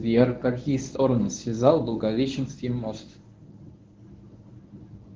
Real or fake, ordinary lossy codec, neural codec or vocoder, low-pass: fake; Opus, 16 kbps; codec, 24 kHz, 0.9 kbps, WavTokenizer, medium speech release version 1; 7.2 kHz